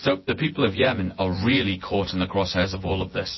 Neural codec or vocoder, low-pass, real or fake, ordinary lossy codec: vocoder, 24 kHz, 100 mel bands, Vocos; 7.2 kHz; fake; MP3, 24 kbps